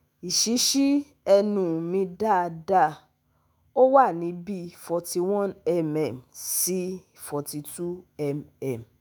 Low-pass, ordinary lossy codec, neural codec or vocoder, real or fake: none; none; autoencoder, 48 kHz, 128 numbers a frame, DAC-VAE, trained on Japanese speech; fake